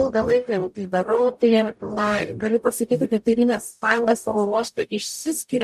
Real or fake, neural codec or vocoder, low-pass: fake; codec, 44.1 kHz, 0.9 kbps, DAC; 14.4 kHz